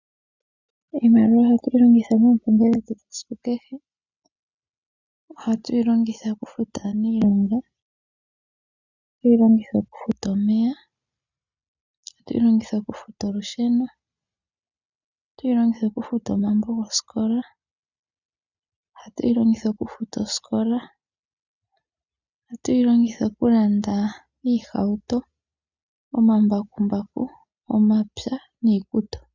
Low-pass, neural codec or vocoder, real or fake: 7.2 kHz; none; real